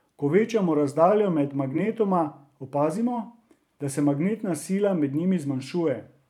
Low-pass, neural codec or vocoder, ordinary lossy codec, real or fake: 19.8 kHz; vocoder, 44.1 kHz, 128 mel bands every 512 samples, BigVGAN v2; none; fake